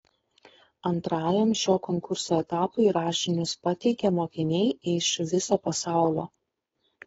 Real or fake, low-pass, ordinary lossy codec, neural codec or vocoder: fake; 7.2 kHz; AAC, 24 kbps; codec, 16 kHz, 4.8 kbps, FACodec